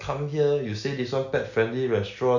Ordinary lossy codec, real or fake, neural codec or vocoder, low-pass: none; real; none; 7.2 kHz